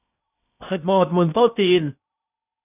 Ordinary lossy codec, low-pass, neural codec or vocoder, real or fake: none; 3.6 kHz; codec, 16 kHz in and 24 kHz out, 0.8 kbps, FocalCodec, streaming, 65536 codes; fake